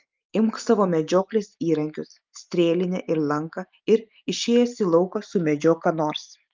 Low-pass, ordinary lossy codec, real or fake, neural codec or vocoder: 7.2 kHz; Opus, 32 kbps; real; none